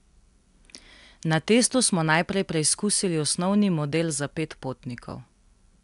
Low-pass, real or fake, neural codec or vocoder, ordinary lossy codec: 10.8 kHz; real; none; MP3, 96 kbps